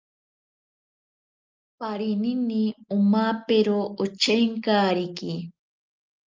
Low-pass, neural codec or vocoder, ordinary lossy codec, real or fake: 7.2 kHz; none; Opus, 24 kbps; real